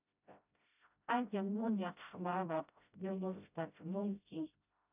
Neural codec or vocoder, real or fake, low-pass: codec, 16 kHz, 0.5 kbps, FreqCodec, smaller model; fake; 3.6 kHz